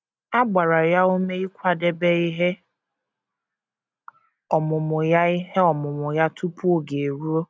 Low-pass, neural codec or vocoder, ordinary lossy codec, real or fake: none; none; none; real